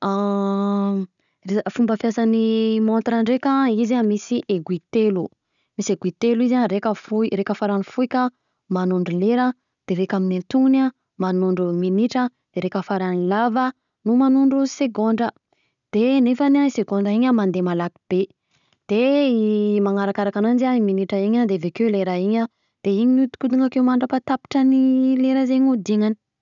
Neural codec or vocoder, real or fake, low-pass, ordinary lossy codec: none; real; 7.2 kHz; none